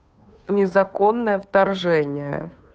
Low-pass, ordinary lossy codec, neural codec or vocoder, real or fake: none; none; codec, 16 kHz, 2 kbps, FunCodec, trained on Chinese and English, 25 frames a second; fake